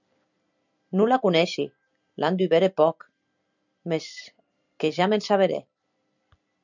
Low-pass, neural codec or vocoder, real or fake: 7.2 kHz; vocoder, 44.1 kHz, 128 mel bands every 256 samples, BigVGAN v2; fake